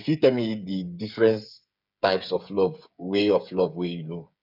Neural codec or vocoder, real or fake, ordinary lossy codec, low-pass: codec, 16 kHz, 8 kbps, FreqCodec, smaller model; fake; none; 5.4 kHz